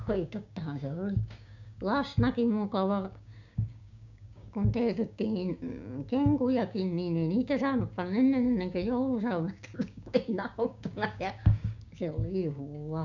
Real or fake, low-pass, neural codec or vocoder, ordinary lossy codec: fake; 7.2 kHz; codec, 16 kHz, 6 kbps, DAC; none